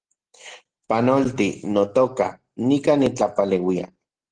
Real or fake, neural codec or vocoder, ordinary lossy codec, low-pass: real; none; Opus, 16 kbps; 9.9 kHz